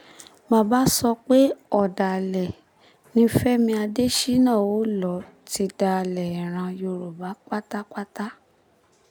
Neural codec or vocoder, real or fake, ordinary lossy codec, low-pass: none; real; none; none